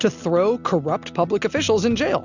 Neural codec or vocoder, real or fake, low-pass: none; real; 7.2 kHz